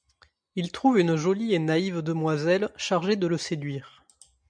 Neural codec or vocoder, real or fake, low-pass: none; real; 9.9 kHz